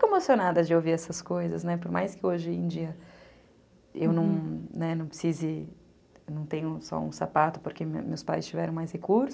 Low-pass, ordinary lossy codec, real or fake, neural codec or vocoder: none; none; real; none